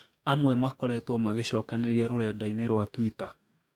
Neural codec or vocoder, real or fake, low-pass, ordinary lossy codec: codec, 44.1 kHz, 2.6 kbps, DAC; fake; 19.8 kHz; none